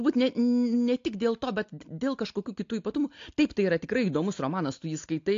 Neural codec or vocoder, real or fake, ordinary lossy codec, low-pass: none; real; AAC, 48 kbps; 7.2 kHz